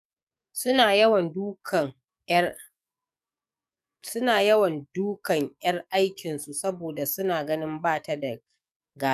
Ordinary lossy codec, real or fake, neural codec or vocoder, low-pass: none; fake; codec, 44.1 kHz, 7.8 kbps, DAC; 14.4 kHz